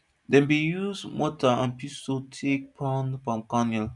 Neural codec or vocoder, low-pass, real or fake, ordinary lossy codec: none; 10.8 kHz; real; none